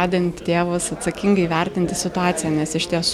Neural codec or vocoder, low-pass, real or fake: none; 19.8 kHz; real